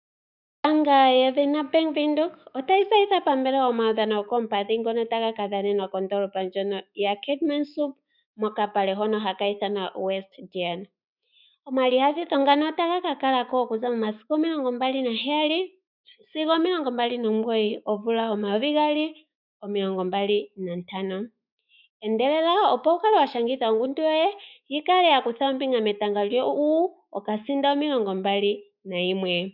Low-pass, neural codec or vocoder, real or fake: 5.4 kHz; autoencoder, 48 kHz, 128 numbers a frame, DAC-VAE, trained on Japanese speech; fake